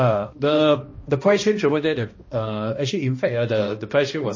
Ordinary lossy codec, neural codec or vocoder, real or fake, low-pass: MP3, 32 kbps; codec, 16 kHz, 1 kbps, X-Codec, HuBERT features, trained on balanced general audio; fake; 7.2 kHz